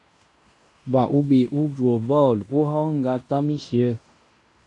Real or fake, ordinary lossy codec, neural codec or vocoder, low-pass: fake; AAC, 64 kbps; codec, 16 kHz in and 24 kHz out, 0.9 kbps, LongCat-Audio-Codec, fine tuned four codebook decoder; 10.8 kHz